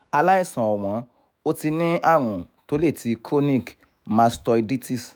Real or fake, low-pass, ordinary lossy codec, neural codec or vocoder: fake; none; none; autoencoder, 48 kHz, 128 numbers a frame, DAC-VAE, trained on Japanese speech